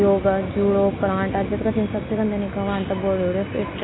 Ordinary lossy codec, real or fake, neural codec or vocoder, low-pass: AAC, 16 kbps; real; none; 7.2 kHz